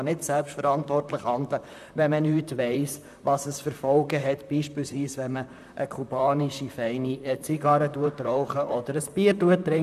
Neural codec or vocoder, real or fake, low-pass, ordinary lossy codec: vocoder, 44.1 kHz, 128 mel bands, Pupu-Vocoder; fake; 14.4 kHz; none